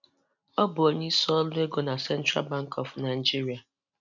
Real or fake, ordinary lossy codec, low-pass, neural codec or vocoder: real; none; 7.2 kHz; none